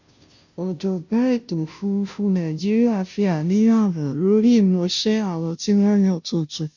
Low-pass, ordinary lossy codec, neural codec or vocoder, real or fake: 7.2 kHz; none; codec, 16 kHz, 0.5 kbps, FunCodec, trained on Chinese and English, 25 frames a second; fake